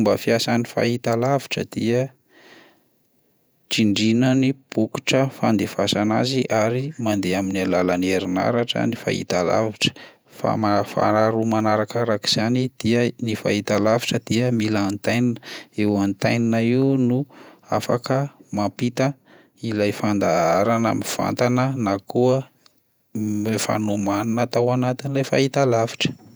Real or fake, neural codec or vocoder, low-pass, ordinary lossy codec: fake; vocoder, 48 kHz, 128 mel bands, Vocos; none; none